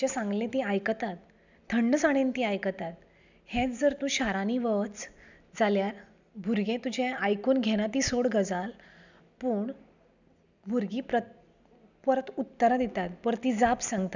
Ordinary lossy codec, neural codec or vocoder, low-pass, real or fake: none; none; 7.2 kHz; real